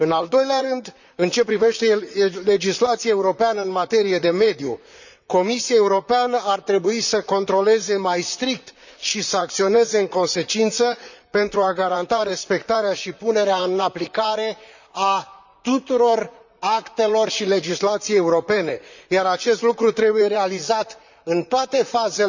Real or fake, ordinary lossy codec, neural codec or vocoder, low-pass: fake; none; vocoder, 44.1 kHz, 128 mel bands, Pupu-Vocoder; 7.2 kHz